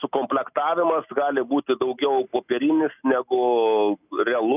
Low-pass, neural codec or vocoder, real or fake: 3.6 kHz; none; real